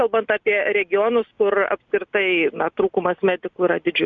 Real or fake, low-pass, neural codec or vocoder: real; 7.2 kHz; none